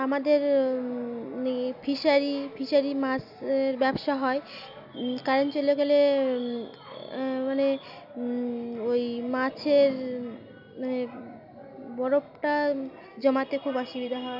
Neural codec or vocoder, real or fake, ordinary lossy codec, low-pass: none; real; none; 5.4 kHz